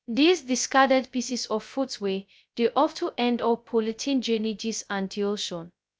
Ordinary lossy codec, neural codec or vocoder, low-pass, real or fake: none; codec, 16 kHz, 0.2 kbps, FocalCodec; none; fake